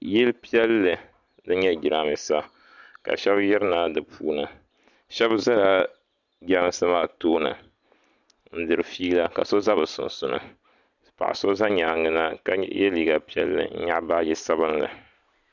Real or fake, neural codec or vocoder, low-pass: real; none; 7.2 kHz